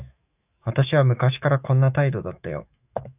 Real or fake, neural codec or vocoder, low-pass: fake; autoencoder, 48 kHz, 128 numbers a frame, DAC-VAE, trained on Japanese speech; 3.6 kHz